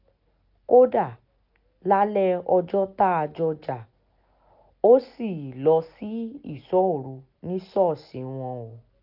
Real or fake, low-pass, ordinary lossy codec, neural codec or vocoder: real; 5.4 kHz; none; none